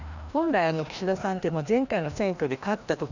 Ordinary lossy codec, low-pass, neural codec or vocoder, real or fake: none; 7.2 kHz; codec, 16 kHz, 1 kbps, FreqCodec, larger model; fake